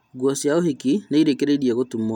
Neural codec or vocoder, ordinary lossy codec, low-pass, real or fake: none; none; 19.8 kHz; real